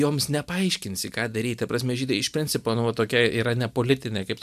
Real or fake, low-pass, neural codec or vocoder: real; 14.4 kHz; none